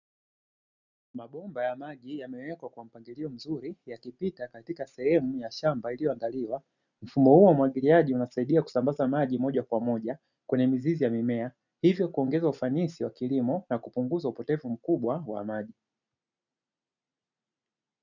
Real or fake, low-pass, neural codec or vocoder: real; 7.2 kHz; none